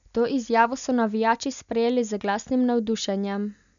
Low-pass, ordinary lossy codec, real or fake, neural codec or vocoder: 7.2 kHz; none; real; none